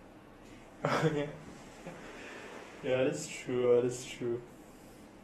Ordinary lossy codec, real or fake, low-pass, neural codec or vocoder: AAC, 32 kbps; fake; 19.8 kHz; vocoder, 48 kHz, 128 mel bands, Vocos